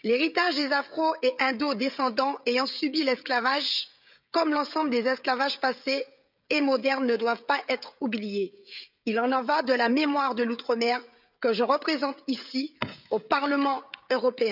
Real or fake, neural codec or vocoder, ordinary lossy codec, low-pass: fake; codec, 16 kHz, 16 kbps, FreqCodec, smaller model; none; 5.4 kHz